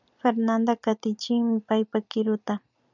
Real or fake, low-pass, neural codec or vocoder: fake; 7.2 kHz; vocoder, 44.1 kHz, 128 mel bands every 256 samples, BigVGAN v2